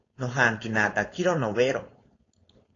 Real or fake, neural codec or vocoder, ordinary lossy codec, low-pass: fake; codec, 16 kHz, 4.8 kbps, FACodec; AAC, 32 kbps; 7.2 kHz